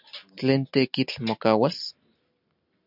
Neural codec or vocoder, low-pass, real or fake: none; 5.4 kHz; real